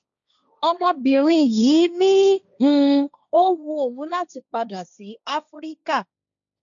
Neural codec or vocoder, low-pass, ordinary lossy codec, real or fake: codec, 16 kHz, 1.1 kbps, Voila-Tokenizer; 7.2 kHz; none; fake